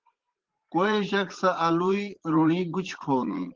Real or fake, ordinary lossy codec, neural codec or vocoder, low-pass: fake; Opus, 16 kbps; vocoder, 44.1 kHz, 80 mel bands, Vocos; 7.2 kHz